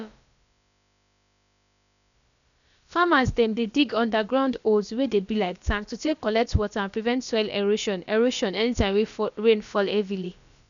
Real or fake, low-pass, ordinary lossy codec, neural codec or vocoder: fake; 7.2 kHz; none; codec, 16 kHz, about 1 kbps, DyCAST, with the encoder's durations